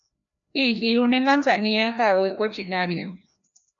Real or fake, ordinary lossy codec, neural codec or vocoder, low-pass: fake; AAC, 64 kbps; codec, 16 kHz, 1 kbps, FreqCodec, larger model; 7.2 kHz